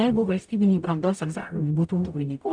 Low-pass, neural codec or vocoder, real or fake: 9.9 kHz; codec, 44.1 kHz, 0.9 kbps, DAC; fake